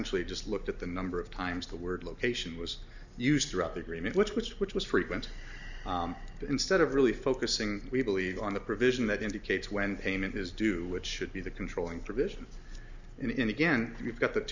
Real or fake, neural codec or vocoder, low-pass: real; none; 7.2 kHz